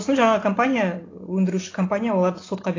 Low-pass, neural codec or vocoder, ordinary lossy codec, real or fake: 7.2 kHz; none; AAC, 32 kbps; real